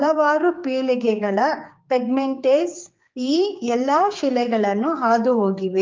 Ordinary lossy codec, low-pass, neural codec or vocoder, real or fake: Opus, 24 kbps; 7.2 kHz; codec, 16 kHz, 4 kbps, X-Codec, HuBERT features, trained on general audio; fake